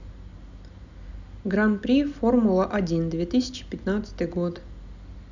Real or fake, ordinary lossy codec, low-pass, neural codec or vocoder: real; none; 7.2 kHz; none